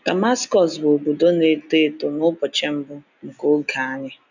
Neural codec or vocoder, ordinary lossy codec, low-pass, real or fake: none; none; 7.2 kHz; real